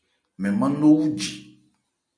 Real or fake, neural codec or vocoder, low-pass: real; none; 9.9 kHz